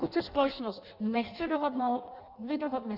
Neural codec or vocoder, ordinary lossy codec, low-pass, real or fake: codec, 16 kHz in and 24 kHz out, 0.6 kbps, FireRedTTS-2 codec; Opus, 64 kbps; 5.4 kHz; fake